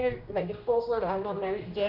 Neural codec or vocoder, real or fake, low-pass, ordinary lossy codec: codec, 16 kHz, 1 kbps, X-Codec, HuBERT features, trained on general audio; fake; 5.4 kHz; none